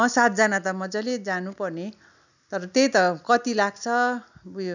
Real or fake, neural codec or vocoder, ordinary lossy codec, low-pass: real; none; none; 7.2 kHz